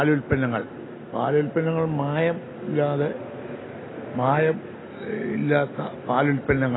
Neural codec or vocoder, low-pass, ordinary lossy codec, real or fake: none; 7.2 kHz; AAC, 16 kbps; real